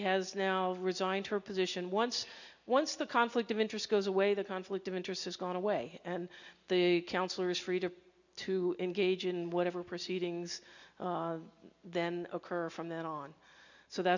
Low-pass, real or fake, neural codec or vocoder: 7.2 kHz; real; none